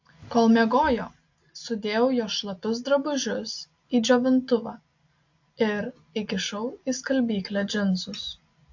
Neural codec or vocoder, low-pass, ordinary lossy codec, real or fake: none; 7.2 kHz; AAC, 48 kbps; real